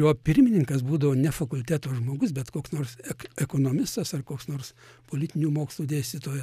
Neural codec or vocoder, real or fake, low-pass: none; real; 14.4 kHz